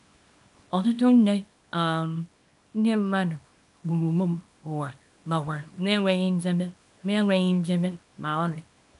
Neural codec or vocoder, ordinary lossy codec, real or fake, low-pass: codec, 24 kHz, 0.9 kbps, WavTokenizer, small release; none; fake; 10.8 kHz